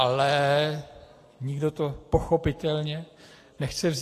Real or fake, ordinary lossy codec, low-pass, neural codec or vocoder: real; AAC, 48 kbps; 14.4 kHz; none